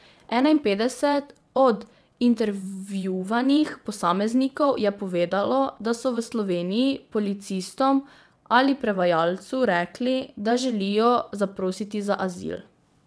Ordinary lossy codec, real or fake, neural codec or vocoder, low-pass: none; fake; vocoder, 22.05 kHz, 80 mel bands, WaveNeXt; none